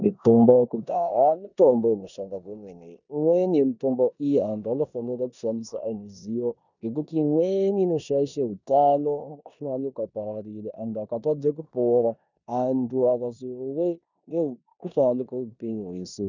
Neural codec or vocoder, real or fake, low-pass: codec, 16 kHz in and 24 kHz out, 0.9 kbps, LongCat-Audio-Codec, four codebook decoder; fake; 7.2 kHz